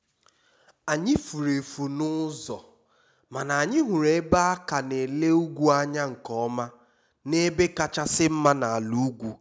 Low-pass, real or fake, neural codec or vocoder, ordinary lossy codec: none; real; none; none